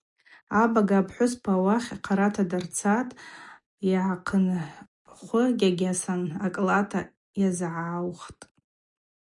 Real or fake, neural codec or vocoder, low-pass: real; none; 10.8 kHz